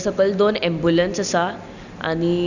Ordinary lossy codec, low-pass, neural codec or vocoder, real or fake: none; 7.2 kHz; none; real